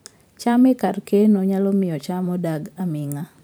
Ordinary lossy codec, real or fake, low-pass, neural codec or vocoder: none; fake; none; vocoder, 44.1 kHz, 128 mel bands every 512 samples, BigVGAN v2